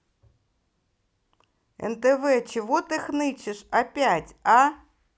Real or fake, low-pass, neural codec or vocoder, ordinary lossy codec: real; none; none; none